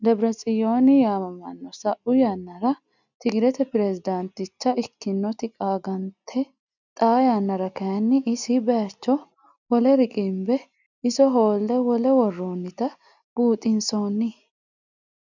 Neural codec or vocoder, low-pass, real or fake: none; 7.2 kHz; real